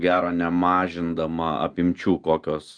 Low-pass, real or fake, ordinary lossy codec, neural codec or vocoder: 9.9 kHz; real; Opus, 32 kbps; none